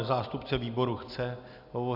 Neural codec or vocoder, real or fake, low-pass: none; real; 5.4 kHz